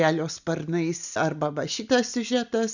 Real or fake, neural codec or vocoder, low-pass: real; none; 7.2 kHz